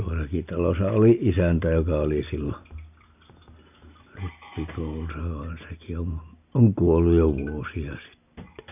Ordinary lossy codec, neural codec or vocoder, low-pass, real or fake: none; none; 3.6 kHz; real